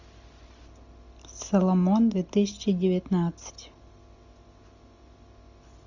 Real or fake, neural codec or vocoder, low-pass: real; none; 7.2 kHz